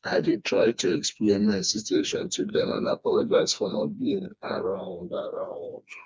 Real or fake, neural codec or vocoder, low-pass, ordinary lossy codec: fake; codec, 16 kHz, 2 kbps, FreqCodec, smaller model; none; none